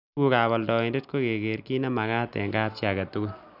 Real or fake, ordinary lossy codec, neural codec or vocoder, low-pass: real; none; none; 5.4 kHz